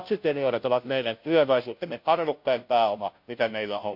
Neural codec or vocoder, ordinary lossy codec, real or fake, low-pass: codec, 16 kHz, 0.5 kbps, FunCodec, trained on Chinese and English, 25 frames a second; MP3, 48 kbps; fake; 5.4 kHz